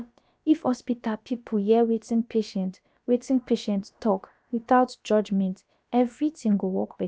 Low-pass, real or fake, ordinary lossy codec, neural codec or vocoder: none; fake; none; codec, 16 kHz, about 1 kbps, DyCAST, with the encoder's durations